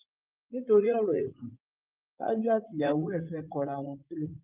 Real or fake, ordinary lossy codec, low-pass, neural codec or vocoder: fake; Opus, 24 kbps; 3.6 kHz; vocoder, 44.1 kHz, 80 mel bands, Vocos